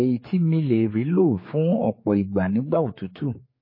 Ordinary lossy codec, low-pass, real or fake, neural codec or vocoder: MP3, 24 kbps; 5.4 kHz; fake; codec, 16 kHz, 4 kbps, X-Codec, HuBERT features, trained on general audio